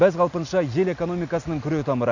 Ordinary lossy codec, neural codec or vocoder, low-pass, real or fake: none; none; 7.2 kHz; real